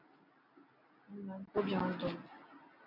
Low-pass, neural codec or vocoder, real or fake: 5.4 kHz; none; real